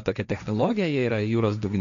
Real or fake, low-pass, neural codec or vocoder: fake; 7.2 kHz; codec, 16 kHz, 1.1 kbps, Voila-Tokenizer